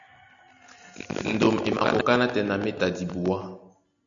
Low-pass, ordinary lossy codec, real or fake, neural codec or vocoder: 7.2 kHz; MP3, 96 kbps; real; none